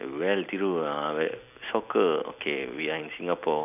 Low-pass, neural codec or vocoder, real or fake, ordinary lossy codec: 3.6 kHz; none; real; none